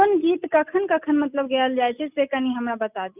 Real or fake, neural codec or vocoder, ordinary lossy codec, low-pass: real; none; none; 3.6 kHz